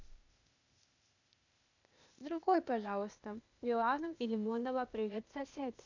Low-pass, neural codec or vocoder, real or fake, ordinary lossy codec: 7.2 kHz; codec, 16 kHz, 0.8 kbps, ZipCodec; fake; none